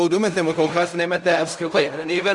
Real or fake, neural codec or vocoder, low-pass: fake; codec, 16 kHz in and 24 kHz out, 0.4 kbps, LongCat-Audio-Codec, fine tuned four codebook decoder; 10.8 kHz